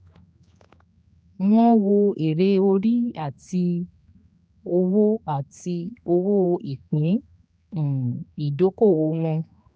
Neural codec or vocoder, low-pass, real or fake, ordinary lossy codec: codec, 16 kHz, 2 kbps, X-Codec, HuBERT features, trained on general audio; none; fake; none